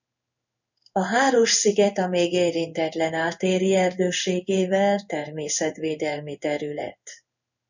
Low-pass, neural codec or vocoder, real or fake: 7.2 kHz; codec, 16 kHz in and 24 kHz out, 1 kbps, XY-Tokenizer; fake